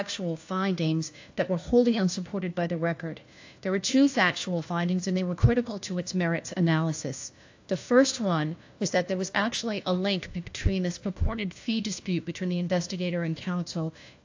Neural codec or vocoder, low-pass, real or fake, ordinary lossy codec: codec, 16 kHz, 1 kbps, FunCodec, trained on LibriTTS, 50 frames a second; 7.2 kHz; fake; AAC, 48 kbps